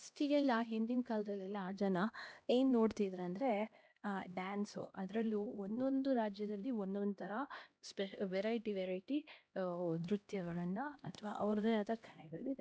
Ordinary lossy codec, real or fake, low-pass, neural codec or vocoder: none; fake; none; codec, 16 kHz, 1 kbps, X-Codec, HuBERT features, trained on LibriSpeech